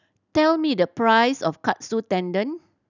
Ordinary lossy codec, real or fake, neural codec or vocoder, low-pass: none; real; none; 7.2 kHz